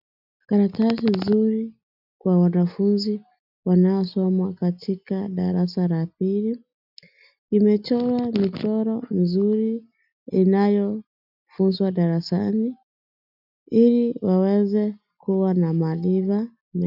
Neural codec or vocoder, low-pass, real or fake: none; 5.4 kHz; real